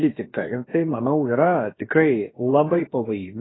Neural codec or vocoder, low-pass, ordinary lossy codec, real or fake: codec, 16 kHz, about 1 kbps, DyCAST, with the encoder's durations; 7.2 kHz; AAC, 16 kbps; fake